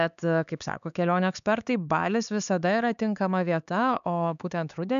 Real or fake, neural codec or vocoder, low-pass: fake; codec, 16 kHz, 4 kbps, X-Codec, HuBERT features, trained on LibriSpeech; 7.2 kHz